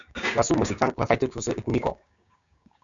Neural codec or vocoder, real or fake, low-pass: codec, 16 kHz, 6 kbps, DAC; fake; 7.2 kHz